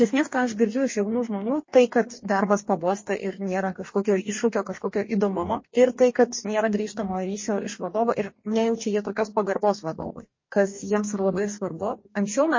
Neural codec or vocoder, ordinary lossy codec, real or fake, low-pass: codec, 44.1 kHz, 2.6 kbps, DAC; MP3, 32 kbps; fake; 7.2 kHz